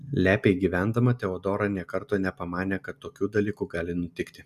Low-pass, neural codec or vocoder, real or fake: 14.4 kHz; none; real